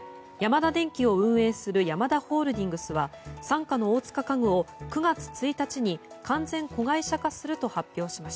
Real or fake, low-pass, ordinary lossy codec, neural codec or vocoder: real; none; none; none